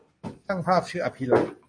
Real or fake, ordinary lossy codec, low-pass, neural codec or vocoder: real; MP3, 48 kbps; 9.9 kHz; none